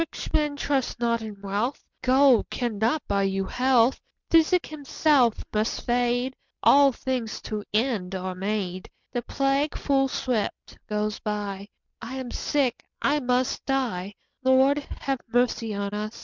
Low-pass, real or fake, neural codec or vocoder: 7.2 kHz; real; none